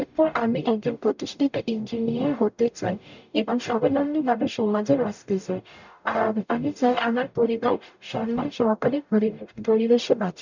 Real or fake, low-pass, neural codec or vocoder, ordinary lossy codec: fake; 7.2 kHz; codec, 44.1 kHz, 0.9 kbps, DAC; none